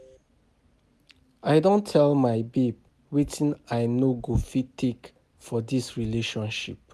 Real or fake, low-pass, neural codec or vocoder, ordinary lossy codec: real; 14.4 kHz; none; MP3, 96 kbps